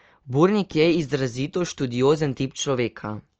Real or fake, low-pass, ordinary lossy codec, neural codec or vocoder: real; 7.2 kHz; Opus, 24 kbps; none